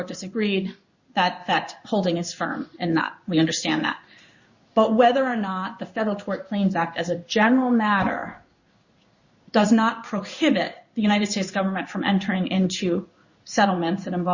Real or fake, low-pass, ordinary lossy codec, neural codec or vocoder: fake; 7.2 kHz; Opus, 64 kbps; codec, 16 kHz in and 24 kHz out, 1 kbps, XY-Tokenizer